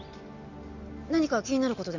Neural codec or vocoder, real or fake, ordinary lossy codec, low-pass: none; real; none; 7.2 kHz